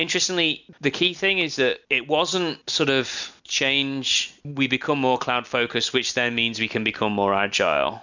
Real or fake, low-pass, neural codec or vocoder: real; 7.2 kHz; none